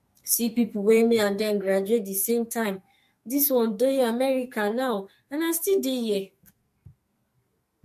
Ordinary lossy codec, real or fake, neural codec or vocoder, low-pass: MP3, 64 kbps; fake; codec, 44.1 kHz, 2.6 kbps, SNAC; 14.4 kHz